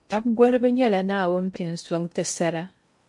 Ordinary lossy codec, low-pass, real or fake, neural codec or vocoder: MP3, 64 kbps; 10.8 kHz; fake; codec, 16 kHz in and 24 kHz out, 0.6 kbps, FocalCodec, streaming, 2048 codes